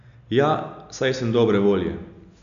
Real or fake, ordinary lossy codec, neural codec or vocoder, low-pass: real; none; none; 7.2 kHz